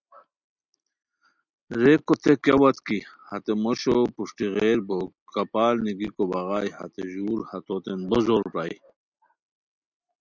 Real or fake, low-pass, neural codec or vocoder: real; 7.2 kHz; none